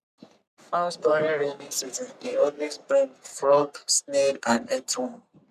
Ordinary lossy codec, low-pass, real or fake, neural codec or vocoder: none; 14.4 kHz; fake; codec, 44.1 kHz, 3.4 kbps, Pupu-Codec